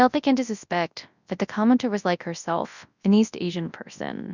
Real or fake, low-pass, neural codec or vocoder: fake; 7.2 kHz; codec, 24 kHz, 0.9 kbps, WavTokenizer, large speech release